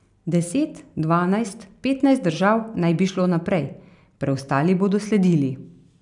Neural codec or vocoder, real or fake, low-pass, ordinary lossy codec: none; real; 10.8 kHz; none